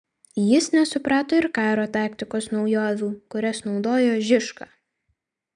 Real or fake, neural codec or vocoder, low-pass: real; none; 9.9 kHz